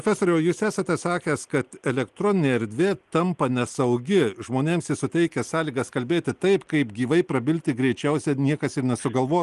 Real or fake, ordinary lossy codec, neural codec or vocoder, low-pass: real; Opus, 24 kbps; none; 10.8 kHz